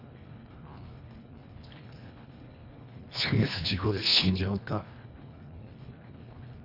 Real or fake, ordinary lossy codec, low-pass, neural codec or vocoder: fake; none; 5.4 kHz; codec, 24 kHz, 1.5 kbps, HILCodec